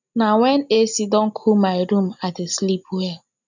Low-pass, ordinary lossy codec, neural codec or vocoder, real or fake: 7.2 kHz; none; none; real